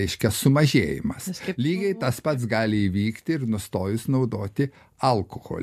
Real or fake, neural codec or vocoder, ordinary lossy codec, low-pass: real; none; MP3, 64 kbps; 14.4 kHz